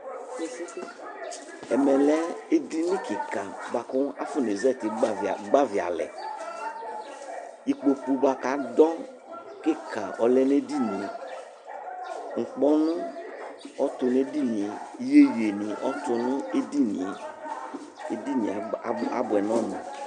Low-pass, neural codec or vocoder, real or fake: 10.8 kHz; none; real